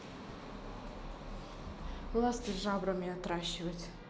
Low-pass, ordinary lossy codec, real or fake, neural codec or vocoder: none; none; real; none